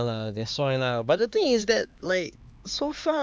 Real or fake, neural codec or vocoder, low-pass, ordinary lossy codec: fake; codec, 16 kHz, 2 kbps, X-Codec, HuBERT features, trained on LibriSpeech; none; none